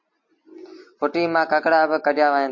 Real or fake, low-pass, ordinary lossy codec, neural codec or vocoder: real; 7.2 kHz; MP3, 48 kbps; none